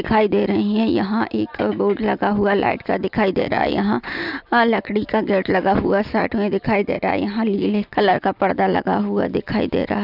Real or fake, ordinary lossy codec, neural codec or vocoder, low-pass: real; none; none; 5.4 kHz